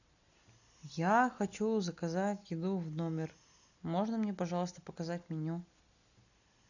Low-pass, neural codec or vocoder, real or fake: 7.2 kHz; none; real